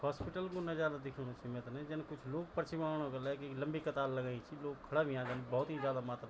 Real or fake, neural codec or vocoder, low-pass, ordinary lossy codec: real; none; none; none